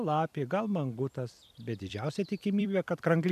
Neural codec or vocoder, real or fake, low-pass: vocoder, 44.1 kHz, 128 mel bands every 256 samples, BigVGAN v2; fake; 14.4 kHz